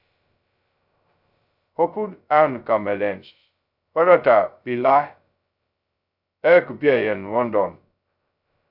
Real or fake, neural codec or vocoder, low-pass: fake; codec, 16 kHz, 0.2 kbps, FocalCodec; 5.4 kHz